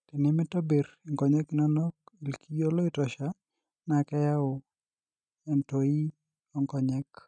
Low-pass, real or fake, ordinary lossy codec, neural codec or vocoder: 9.9 kHz; real; none; none